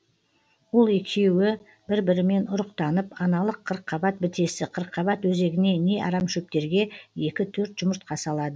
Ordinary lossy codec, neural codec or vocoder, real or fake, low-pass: none; none; real; none